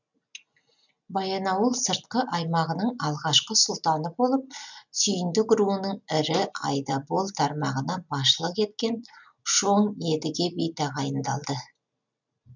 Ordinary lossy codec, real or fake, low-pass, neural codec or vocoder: none; real; 7.2 kHz; none